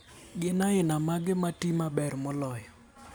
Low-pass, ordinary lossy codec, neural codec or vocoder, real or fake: none; none; none; real